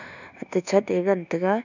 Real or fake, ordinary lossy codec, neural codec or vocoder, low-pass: fake; none; codec, 24 kHz, 1.2 kbps, DualCodec; 7.2 kHz